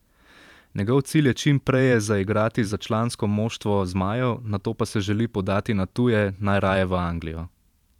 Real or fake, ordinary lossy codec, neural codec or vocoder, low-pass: fake; none; vocoder, 44.1 kHz, 128 mel bands every 512 samples, BigVGAN v2; 19.8 kHz